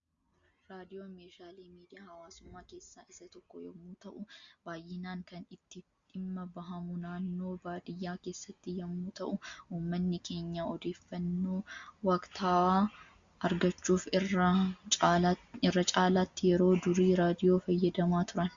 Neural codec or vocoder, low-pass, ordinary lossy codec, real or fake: none; 7.2 kHz; Opus, 64 kbps; real